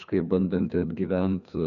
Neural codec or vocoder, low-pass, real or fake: codec, 16 kHz, 2 kbps, FreqCodec, larger model; 7.2 kHz; fake